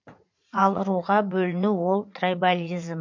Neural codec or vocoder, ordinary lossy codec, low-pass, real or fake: vocoder, 44.1 kHz, 80 mel bands, Vocos; MP3, 48 kbps; 7.2 kHz; fake